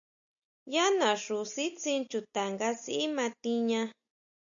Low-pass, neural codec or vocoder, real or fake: 7.2 kHz; none; real